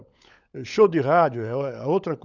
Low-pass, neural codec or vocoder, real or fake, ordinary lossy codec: 7.2 kHz; codec, 16 kHz, 16 kbps, FunCodec, trained on LibriTTS, 50 frames a second; fake; none